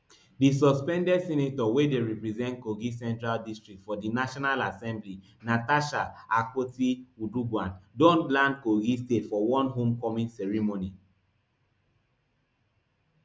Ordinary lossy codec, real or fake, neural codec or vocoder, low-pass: none; real; none; none